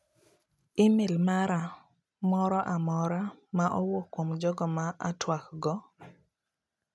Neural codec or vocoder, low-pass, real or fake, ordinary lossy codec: none; none; real; none